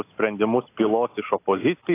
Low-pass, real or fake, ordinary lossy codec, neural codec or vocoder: 3.6 kHz; real; AAC, 24 kbps; none